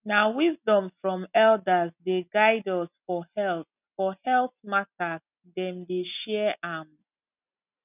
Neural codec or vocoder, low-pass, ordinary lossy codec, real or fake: none; 3.6 kHz; none; real